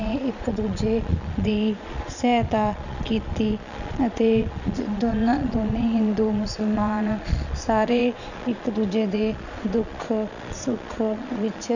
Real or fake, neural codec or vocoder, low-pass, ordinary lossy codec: fake; vocoder, 22.05 kHz, 80 mel bands, Vocos; 7.2 kHz; none